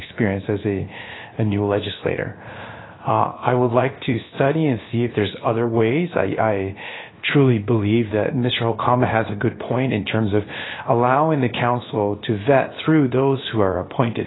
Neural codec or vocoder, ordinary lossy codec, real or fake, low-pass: codec, 16 kHz, about 1 kbps, DyCAST, with the encoder's durations; AAC, 16 kbps; fake; 7.2 kHz